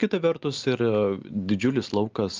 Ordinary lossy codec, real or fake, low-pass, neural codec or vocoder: Opus, 24 kbps; real; 7.2 kHz; none